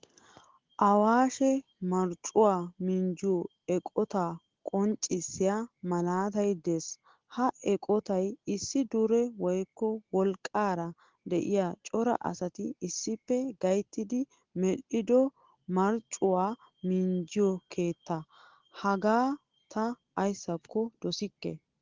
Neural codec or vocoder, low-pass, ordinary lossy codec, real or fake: none; 7.2 kHz; Opus, 16 kbps; real